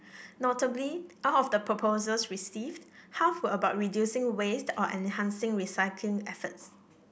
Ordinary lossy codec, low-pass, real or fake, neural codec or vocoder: none; none; real; none